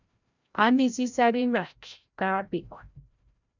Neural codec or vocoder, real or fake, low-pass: codec, 16 kHz, 0.5 kbps, FreqCodec, larger model; fake; 7.2 kHz